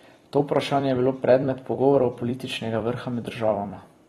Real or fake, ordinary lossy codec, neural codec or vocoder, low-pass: fake; AAC, 32 kbps; vocoder, 44.1 kHz, 128 mel bands every 256 samples, BigVGAN v2; 19.8 kHz